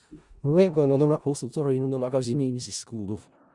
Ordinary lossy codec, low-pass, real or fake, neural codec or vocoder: Opus, 64 kbps; 10.8 kHz; fake; codec, 16 kHz in and 24 kHz out, 0.4 kbps, LongCat-Audio-Codec, four codebook decoder